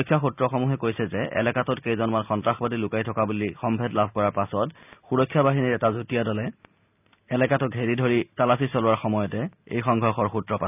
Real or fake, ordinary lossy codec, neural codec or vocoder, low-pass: real; none; none; 3.6 kHz